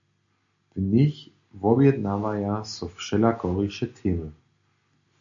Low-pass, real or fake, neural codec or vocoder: 7.2 kHz; real; none